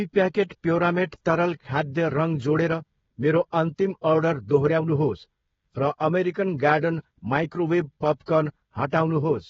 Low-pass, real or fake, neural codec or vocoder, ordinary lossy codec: 7.2 kHz; fake; codec, 16 kHz, 16 kbps, FreqCodec, smaller model; AAC, 24 kbps